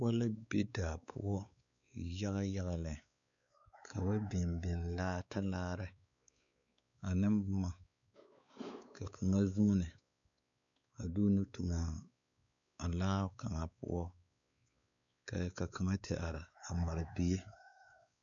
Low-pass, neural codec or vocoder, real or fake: 7.2 kHz; codec, 16 kHz, 4 kbps, X-Codec, WavLM features, trained on Multilingual LibriSpeech; fake